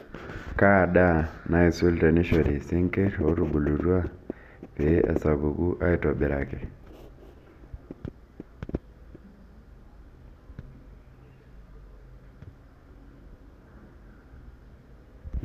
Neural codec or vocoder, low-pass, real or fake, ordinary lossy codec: none; 14.4 kHz; real; none